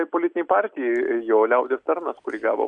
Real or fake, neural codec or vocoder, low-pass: real; none; 9.9 kHz